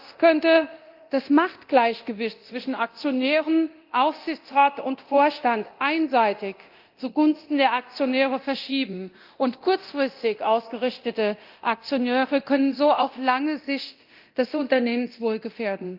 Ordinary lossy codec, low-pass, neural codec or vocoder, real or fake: Opus, 32 kbps; 5.4 kHz; codec, 24 kHz, 0.9 kbps, DualCodec; fake